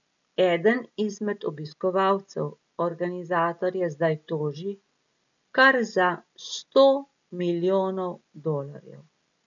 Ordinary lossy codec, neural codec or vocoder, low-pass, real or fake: none; none; 7.2 kHz; real